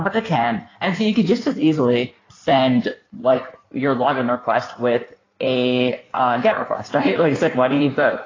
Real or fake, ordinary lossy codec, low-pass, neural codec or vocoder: fake; AAC, 32 kbps; 7.2 kHz; codec, 16 kHz in and 24 kHz out, 1.1 kbps, FireRedTTS-2 codec